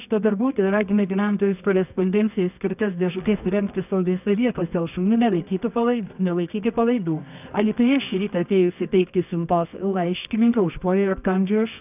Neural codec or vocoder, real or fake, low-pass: codec, 24 kHz, 0.9 kbps, WavTokenizer, medium music audio release; fake; 3.6 kHz